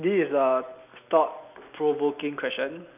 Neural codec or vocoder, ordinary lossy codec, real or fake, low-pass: none; none; real; 3.6 kHz